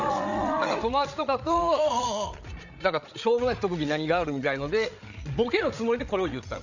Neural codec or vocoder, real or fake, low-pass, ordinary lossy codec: codec, 16 kHz, 8 kbps, FreqCodec, larger model; fake; 7.2 kHz; none